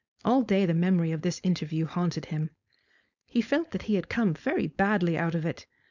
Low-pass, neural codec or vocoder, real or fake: 7.2 kHz; codec, 16 kHz, 4.8 kbps, FACodec; fake